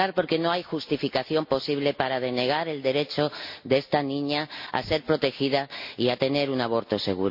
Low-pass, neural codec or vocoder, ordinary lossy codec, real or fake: 5.4 kHz; none; MP3, 32 kbps; real